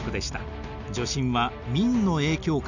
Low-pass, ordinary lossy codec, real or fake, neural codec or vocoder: 7.2 kHz; none; real; none